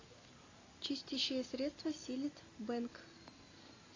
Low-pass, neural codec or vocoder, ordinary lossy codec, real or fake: 7.2 kHz; none; AAC, 32 kbps; real